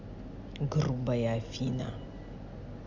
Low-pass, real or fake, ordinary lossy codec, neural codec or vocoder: 7.2 kHz; real; MP3, 64 kbps; none